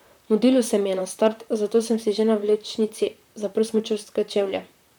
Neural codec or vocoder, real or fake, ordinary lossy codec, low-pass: vocoder, 44.1 kHz, 128 mel bands, Pupu-Vocoder; fake; none; none